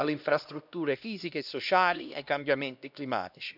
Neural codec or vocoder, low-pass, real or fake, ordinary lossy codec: codec, 16 kHz, 1 kbps, X-Codec, HuBERT features, trained on LibriSpeech; 5.4 kHz; fake; none